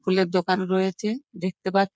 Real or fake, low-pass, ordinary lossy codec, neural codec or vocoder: fake; none; none; codec, 16 kHz, 8 kbps, FreqCodec, smaller model